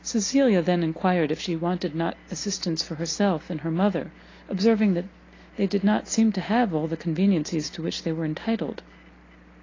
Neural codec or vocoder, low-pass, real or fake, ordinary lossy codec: none; 7.2 kHz; real; AAC, 32 kbps